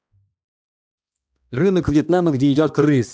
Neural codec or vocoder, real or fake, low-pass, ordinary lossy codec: codec, 16 kHz, 1 kbps, X-Codec, HuBERT features, trained on balanced general audio; fake; none; none